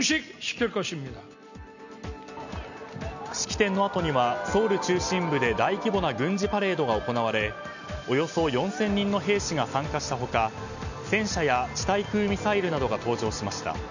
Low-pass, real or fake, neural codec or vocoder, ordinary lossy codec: 7.2 kHz; real; none; none